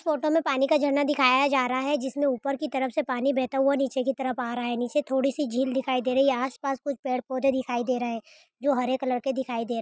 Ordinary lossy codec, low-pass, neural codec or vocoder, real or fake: none; none; none; real